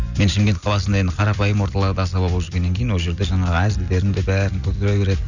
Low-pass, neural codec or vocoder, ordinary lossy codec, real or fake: 7.2 kHz; none; none; real